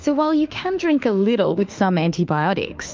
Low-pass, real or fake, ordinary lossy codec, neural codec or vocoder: 7.2 kHz; fake; Opus, 24 kbps; autoencoder, 48 kHz, 32 numbers a frame, DAC-VAE, trained on Japanese speech